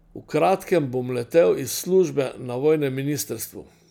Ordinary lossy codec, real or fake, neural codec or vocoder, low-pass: none; real; none; none